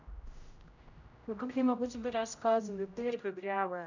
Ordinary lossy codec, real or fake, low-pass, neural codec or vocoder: none; fake; 7.2 kHz; codec, 16 kHz, 0.5 kbps, X-Codec, HuBERT features, trained on general audio